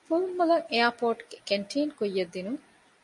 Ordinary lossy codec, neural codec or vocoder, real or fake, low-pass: MP3, 48 kbps; none; real; 10.8 kHz